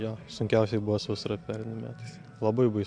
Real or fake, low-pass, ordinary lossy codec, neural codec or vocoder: real; 9.9 kHz; AAC, 64 kbps; none